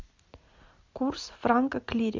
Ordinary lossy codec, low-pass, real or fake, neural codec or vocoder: MP3, 64 kbps; 7.2 kHz; real; none